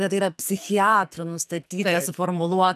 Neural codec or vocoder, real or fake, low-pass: codec, 44.1 kHz, 2.6 kbps, SNAC; fake; 14.4 kHz